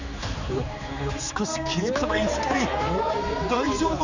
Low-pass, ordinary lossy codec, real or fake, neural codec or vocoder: 7.2 kHz; none; fake; codec, 16 kHz, 4 kbps, X-Codec, HuBERT features, trained on general audio